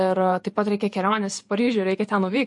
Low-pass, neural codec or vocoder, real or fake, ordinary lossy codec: 10.8 kHz; vocoder, 48 kHz, 128 mel bands, Vocos; fake; MP3, 48 kbps